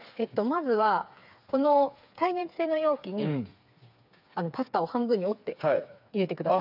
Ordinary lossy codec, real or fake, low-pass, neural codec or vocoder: none; fake; 5.4 kHz; codec, 16 kHz, 4 kbps, FreqCodec, smaller model